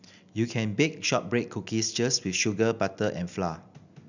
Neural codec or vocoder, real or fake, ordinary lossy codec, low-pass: none; real; none; 7.2 kHz